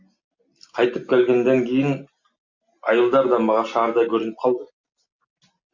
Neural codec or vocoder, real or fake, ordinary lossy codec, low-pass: none; real; MP3, 48 kbps; 7.2 kHz